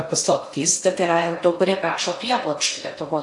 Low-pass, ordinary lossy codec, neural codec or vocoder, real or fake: 10.8 kHz; AAC, 64 kbps; codec, 16 kHz in and 24 kHz out, 0.6 kbps, FocalCodec, streaming, 2048 codes; fake